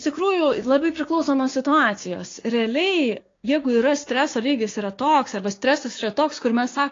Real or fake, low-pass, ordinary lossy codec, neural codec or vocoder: fake; 7.2 kHz; AAC, 32 kbps; codec, 16 kHz, 6 kbps, DAC